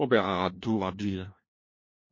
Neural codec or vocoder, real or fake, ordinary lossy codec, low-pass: codec, 16 kHz, 1 kbps, FunCodec, trained on LibriTTS, 50 frames a second; fake; MP3, 32 kbps; 7.2 kHz